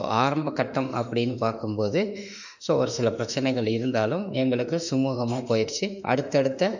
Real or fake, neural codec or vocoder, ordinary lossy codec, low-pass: fake; autoencoder, 48 kHz, 32 numbers a frame, DAC-VAE, trained on Japanese speech; none; 7.2 kHz